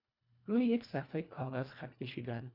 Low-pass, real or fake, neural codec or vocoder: 5.4 kHz; fake; codec, 24 kHz, 1.5 kbps, HILCodec